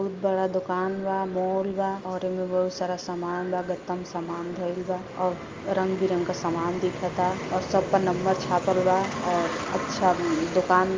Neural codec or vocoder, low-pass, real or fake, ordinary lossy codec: none; 7.2 kHz; real; Opus, 32 kbps